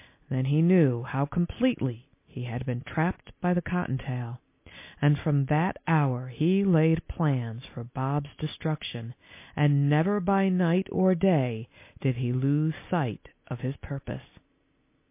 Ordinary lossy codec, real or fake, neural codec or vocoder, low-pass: MP3, 24 kbps; real; none; 3.6 kHz